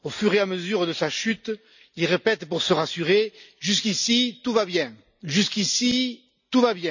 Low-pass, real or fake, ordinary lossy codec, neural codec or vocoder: 7.2 kHz; real; none; none